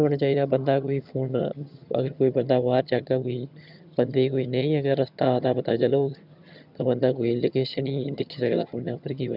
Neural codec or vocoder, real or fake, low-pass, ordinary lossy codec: vocoder, 22.05 kHz, 80 mel bands, HiFi-GAN; fake; 5.4 kHz; none